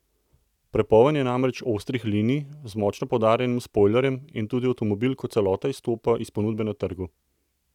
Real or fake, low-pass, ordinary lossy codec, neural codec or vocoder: real; 19.8 kHz; none; none